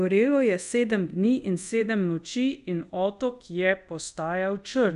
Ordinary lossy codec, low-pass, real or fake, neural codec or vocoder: none; 10.8 kHz; fake; codec, 24 kHz, 0.5 kbps, DualCodec